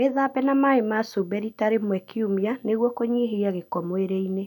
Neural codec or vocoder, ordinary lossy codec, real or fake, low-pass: none; none; real; 19.8 kHz